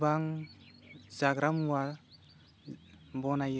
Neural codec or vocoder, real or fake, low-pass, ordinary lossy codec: none; real; none; none